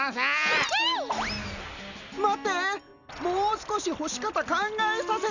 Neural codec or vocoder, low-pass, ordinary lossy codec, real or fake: none; 7.2 kHz; none; real